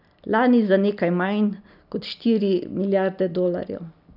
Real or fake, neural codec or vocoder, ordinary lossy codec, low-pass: real; none; none; 5.4 kHz